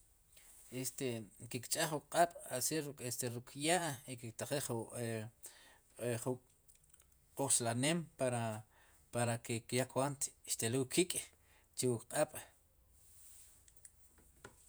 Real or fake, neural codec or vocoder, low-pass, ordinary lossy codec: fake; vocoder, 48 kHz, 128 mel bands, Vocos; none; none